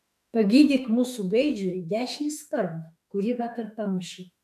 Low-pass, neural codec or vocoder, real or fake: 14.4 kHz; autoencoder, 48 kHz, 32 numbers a frame, DAC-VAE, trained on Japanese speech; fake